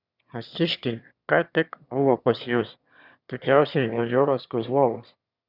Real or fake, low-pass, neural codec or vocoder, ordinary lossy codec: fake; 5.4 kHz; autoencoder, 22.05 kHz, a latent of 192 numbers a frame, VITS, trained on one speaker; Opus, 64 kbps